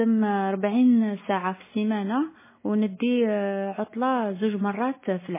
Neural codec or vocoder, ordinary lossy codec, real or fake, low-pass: none; MP3, 16 kbps; real; 3.6 kHz